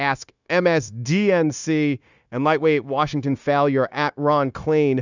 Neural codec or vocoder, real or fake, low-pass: codec, 16 kHz, 0.9 kbps, LongCat-Audio-Codec; fake; 7.2 kHz